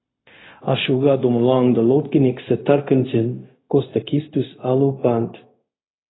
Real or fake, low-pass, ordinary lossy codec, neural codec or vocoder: fake; 7.2 kHz; AAC, 16 kbps; codec, 16 kHz, 0.4 kbps, LongCat-Audio-Codec